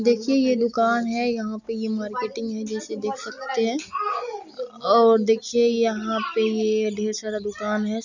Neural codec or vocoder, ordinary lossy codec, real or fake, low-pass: none; none; real; 7.2 kHz